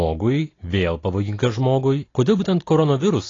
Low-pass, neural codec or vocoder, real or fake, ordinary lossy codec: 7.2 kHz; none; real; AAC, 32 kbps